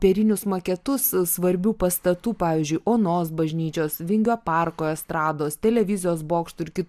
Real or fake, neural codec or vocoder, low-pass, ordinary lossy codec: real; none; 14.4 kHz; AAC, 96 kbps